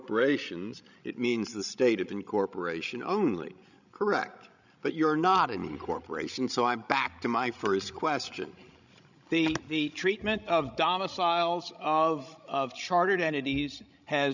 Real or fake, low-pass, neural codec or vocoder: fake; 7.2 kHz; codec, 16 kHz, 16 kbps, FreqCodec, larger model